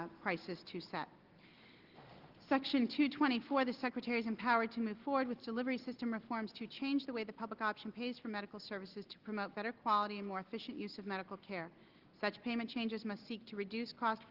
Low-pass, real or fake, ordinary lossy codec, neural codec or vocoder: 5.4 kHz; real; Opus, 16 kbps; none